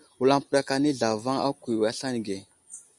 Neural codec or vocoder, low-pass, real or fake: none; 10.8 kHz; real